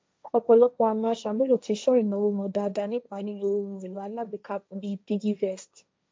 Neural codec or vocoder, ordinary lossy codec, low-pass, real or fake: codec, 16 kHz, 1.1 kbps, Voila-Tokenizer; none; none; fake